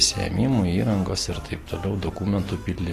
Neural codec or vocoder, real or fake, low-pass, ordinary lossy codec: none; real; 14.4 kHz; AAC, 48 kbps